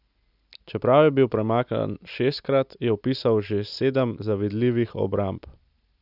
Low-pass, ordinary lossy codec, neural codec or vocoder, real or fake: 5.4 kHz; none; none; real